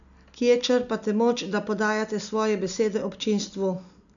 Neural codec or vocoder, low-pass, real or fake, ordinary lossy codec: none; 7.2 kHz; real; none